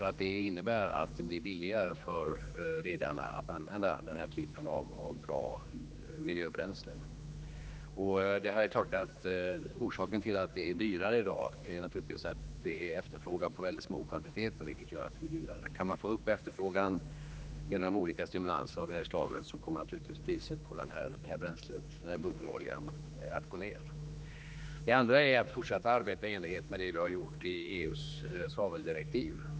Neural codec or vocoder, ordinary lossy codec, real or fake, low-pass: codec, 16 kHz, 2 kbps, X-Codec, HuBERT features, trained on general audio; none; fake; none